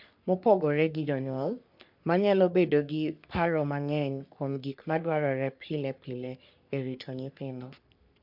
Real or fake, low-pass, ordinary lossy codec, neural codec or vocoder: fake; 5.4 kHz; MP3, 48 kbps; codec, 44.1 kHz, 3.4 kbps, Pupu-Codec